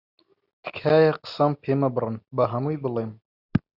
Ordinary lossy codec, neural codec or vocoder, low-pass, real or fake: Opus, 64 kbps; none; 5.4 kHz; real